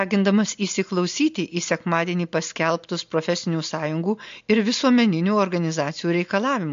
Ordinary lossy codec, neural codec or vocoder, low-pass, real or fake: MP3, 48 kbps; none; 7.2 kHz; real